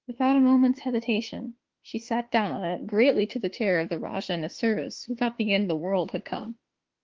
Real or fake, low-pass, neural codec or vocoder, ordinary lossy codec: fake; 7.2 kHz; autoencoder, 48 kHz, 32 numbers a frame, DAC-VAE, trained on Japanese speech; Opus, 16 kbps